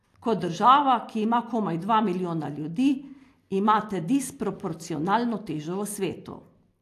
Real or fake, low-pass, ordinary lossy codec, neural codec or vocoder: real; 14.4 kHz; AAC, 64 kbps; none